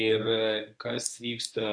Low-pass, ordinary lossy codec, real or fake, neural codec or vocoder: 9.9 kHz; MP3, 64 kbps; fake; codec, 24 kHz, 0.9 kbps, WavTokenizer, medium speech release version 1